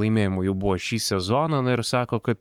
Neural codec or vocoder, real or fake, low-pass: codec, 44.1 kHz, 7.8 kbps, Pupu-Codec; fake; 19.8 kHz